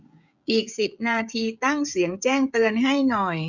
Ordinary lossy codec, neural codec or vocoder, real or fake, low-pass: none; codec, 16 kHz, 16 kbps, FreqCodec, smaller model; fake; 7.2 kHz